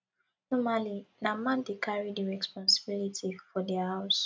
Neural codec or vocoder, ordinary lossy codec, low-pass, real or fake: none; none; none; real